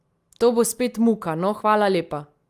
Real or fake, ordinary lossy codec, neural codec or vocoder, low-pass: real; Opus, 32 kbps; none; 14.4 kHz